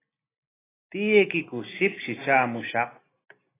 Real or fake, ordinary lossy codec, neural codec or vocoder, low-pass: real; AAC, 16 kbps; none; 3.6 kHz